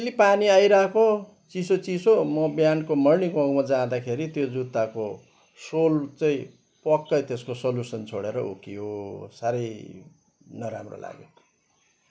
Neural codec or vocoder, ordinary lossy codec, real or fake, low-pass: none; none; real; none